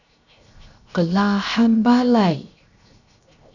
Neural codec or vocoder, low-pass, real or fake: codec, 16 kHz, 0.7 kbps, FocalCodec; 7.2 kHz; fake